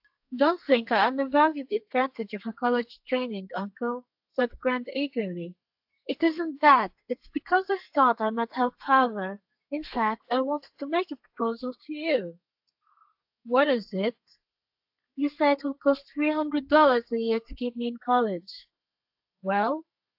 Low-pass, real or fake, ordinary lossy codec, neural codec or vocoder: 5.4 kHz; fake; AAC, 48 kbps; codec, 32 kHz, 1.9 kbps, SNAC